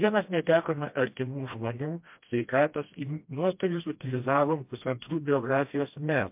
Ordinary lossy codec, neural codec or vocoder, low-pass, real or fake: MP3, 32 kbps; codec, 16 kHz, 1 kbps, FreqCodec, smaller model; 3.6 kHz; fake